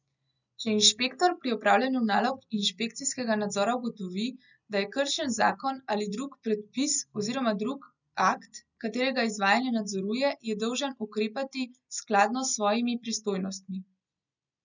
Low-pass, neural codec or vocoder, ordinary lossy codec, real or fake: 7.2 kHz; none; none; real